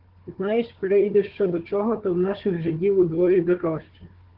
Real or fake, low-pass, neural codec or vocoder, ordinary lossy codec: fake; 5.4 kHz; codec, 16 kHz, 4 kbps, FunCodec, trained on Chinese and English, 50 frames a second; Opus, 16 kbps